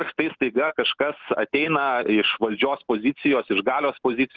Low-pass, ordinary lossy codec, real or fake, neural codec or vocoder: 7.2 kHz; Opus, 32 kbps; real; none